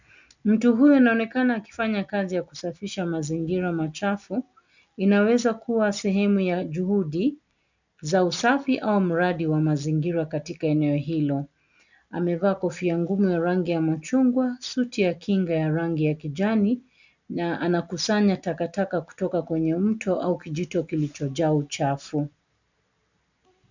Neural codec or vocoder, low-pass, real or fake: none; 7.2 kHz; real